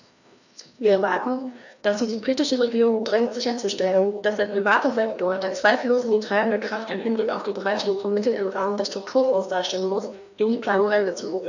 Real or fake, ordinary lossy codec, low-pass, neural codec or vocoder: fake; none; 7.2 kHz; codec, 16 kHz, 1 kbps, FreqCodec, larger model